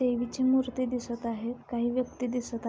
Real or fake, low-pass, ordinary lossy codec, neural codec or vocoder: real; none; none; none